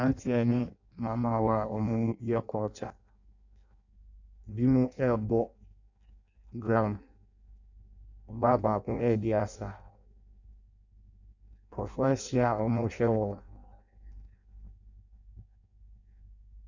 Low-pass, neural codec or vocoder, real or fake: 7.2 kHz; codec, 16 kHz in and 24 kHz out, 0.6 kbps, FireRedTTS-2 codec; fake